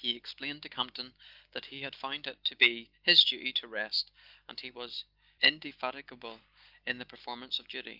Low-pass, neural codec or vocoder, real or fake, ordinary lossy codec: 5.4 kHz; none; real; Opus, 24 kbps